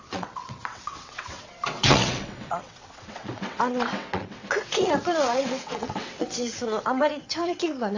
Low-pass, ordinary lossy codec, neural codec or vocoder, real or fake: 7.2 kHz; AAC, 48 kbps; vocoder, 22.05 kHz, 80 mel bands, WaveNeXt; fake